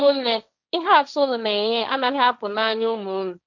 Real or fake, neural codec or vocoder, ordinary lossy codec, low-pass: fake; codec, 16 kHz, 1.1 kbps, Voila-Tokenizer; none; 7.2 kHz